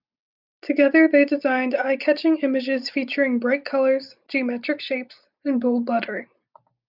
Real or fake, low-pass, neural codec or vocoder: real; 5.4 kHz; none